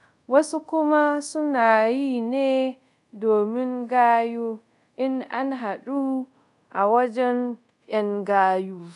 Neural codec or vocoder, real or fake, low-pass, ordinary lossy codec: codec, 24 kHz, 0.5 kbps, DualCodec; fake; 10.8 kHz; none